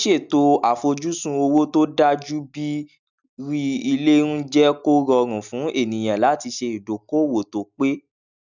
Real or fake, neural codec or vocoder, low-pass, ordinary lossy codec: real; none; 7.2 kHz; none